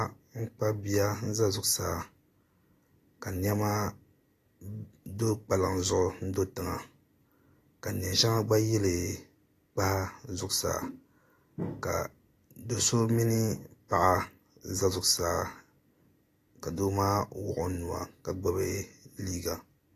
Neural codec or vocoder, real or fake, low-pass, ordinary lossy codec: none; real; 14.4 kHz; AAC, 48 kbps